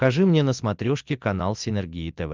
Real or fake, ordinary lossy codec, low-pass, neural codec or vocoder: real; Opus, 24 kbps; 7.2 kHz; none